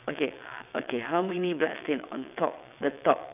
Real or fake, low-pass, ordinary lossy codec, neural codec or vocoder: fake; 3.6 kHz; none; vocoder, 22.05 kHz, 80 mel bands, WaveNeXt